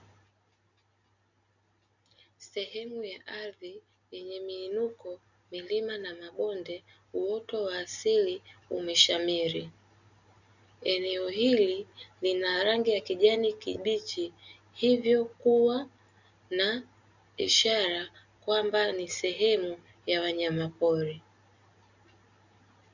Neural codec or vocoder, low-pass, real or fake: none; 7.2 kHz; real